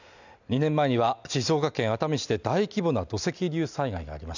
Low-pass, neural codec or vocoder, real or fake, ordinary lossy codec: 7.2 kHz; none; real; none